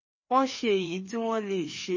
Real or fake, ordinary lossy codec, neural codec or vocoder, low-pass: fake; MP3, 32 kbps; codec, 16 kHz, 2 kbps, FreqCodec, larger model; 7.2 kHz